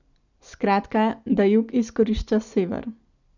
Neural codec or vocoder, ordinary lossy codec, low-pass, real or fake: none; none; 7.2 kHz; real